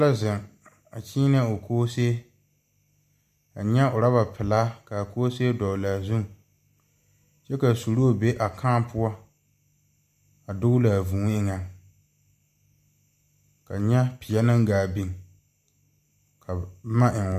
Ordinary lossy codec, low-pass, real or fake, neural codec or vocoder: MP3, 96 kbps; 14.4 kHz; real; none